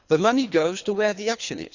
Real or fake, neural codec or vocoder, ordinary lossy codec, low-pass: fake; codec, 24 kHz, 3 kbps, HILCodec; Opus, 64 kbps; 7.2 kHz